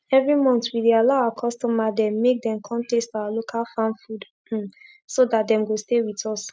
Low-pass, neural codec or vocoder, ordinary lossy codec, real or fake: none; none; none; real